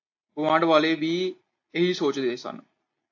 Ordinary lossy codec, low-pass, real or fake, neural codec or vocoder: AAC, 48 kbps; 7.2 kHz; real; none